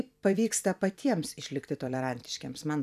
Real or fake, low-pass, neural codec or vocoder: fake; 14.4 kHz; vocoder, 44.1 kHz, 128 mel bands every 256 samples, BigVGAN v2